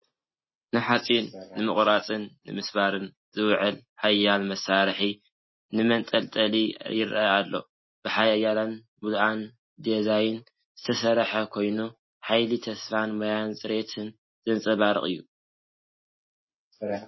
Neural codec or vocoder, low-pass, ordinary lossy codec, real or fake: none; 7.2 kHz; MP3, 24 kbps; real